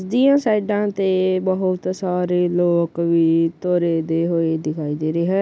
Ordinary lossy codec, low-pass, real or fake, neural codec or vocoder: none; none; real; none